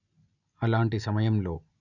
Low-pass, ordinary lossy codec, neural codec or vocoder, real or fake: 7.2 kHz; none; none; real